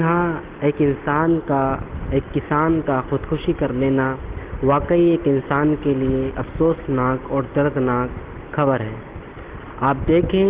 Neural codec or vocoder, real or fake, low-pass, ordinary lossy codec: none; real; 3.6 kHz; Opus, 16 kbps